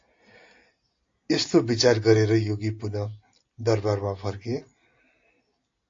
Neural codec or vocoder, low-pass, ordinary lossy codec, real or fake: none; 7.2 kHz; AAC, 48 kbps; real